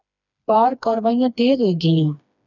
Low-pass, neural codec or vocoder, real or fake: 7.2 kHz; codec, 16 kHz, 2 kbps, FreqCodec, smaller model; fake